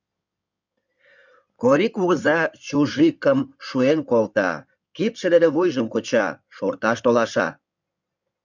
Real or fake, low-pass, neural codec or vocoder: fake; 7.2 kHz; codec, 16 kHz in and 24 kHz out, 2.2 kbps, FireRedTTS-2 codec